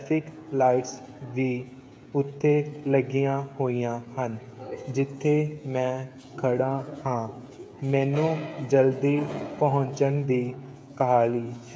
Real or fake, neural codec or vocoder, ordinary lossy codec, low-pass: fake; codec, 16 kHz, 16 kbps, FreqCodec, smaller model; none; none